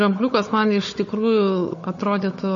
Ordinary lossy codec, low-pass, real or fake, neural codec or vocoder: MP3, 32 kbps; 7.2 kHz; fake; codec, 16 kHz, 16 kbps, FunCodec, trained on Chinese and English, 50 frames a second